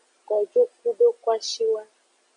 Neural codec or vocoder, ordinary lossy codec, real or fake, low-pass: none; MP3, 64 kbps; real; 9.9 kHz